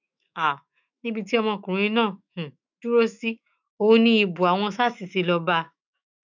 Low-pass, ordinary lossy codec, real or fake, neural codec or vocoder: 7.2 kHz; none; fake; autoencoder, 48 kHz, 128 numbers a frame, DAC-VAE, trained on Japanese speech